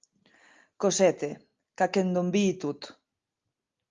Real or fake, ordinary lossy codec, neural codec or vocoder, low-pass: real; Opus, 32 kbps; none; 7.2 kHz